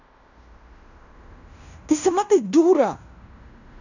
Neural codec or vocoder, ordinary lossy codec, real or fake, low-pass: codec, 16 kHz in and 24 kHz out, 0.9 kbps, LongCat-Audio-Codec, fine tuned four codebook decoder; none; fake; 7.2 kHz